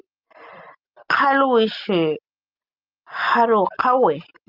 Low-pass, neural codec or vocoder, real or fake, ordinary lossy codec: 5.4 kHz; none; real; Opus, 24 kbps